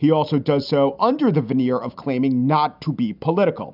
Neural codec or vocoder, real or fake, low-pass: none; real; 5.4 kHz